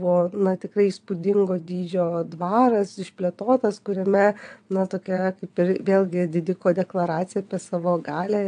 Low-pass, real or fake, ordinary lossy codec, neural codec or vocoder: 9.9 kHz; fake; AAC, 64 kbps; vocoder, 22.05 kHz, 80 mel bands, WaveNeXt